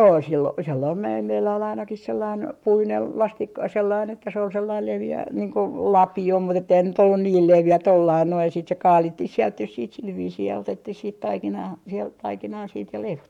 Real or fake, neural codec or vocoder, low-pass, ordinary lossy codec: fake; codec, 44.1 kHz, 7.8 kbps, Pupu-Codec; 19.8 kHz; none